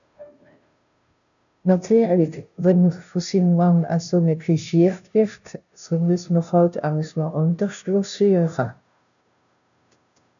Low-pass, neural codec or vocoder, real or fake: 7.2 kHz; codec, 16 kHz, 0.5 kbps, FunCodec, trained on Chinese and English, 25 frames a second; fake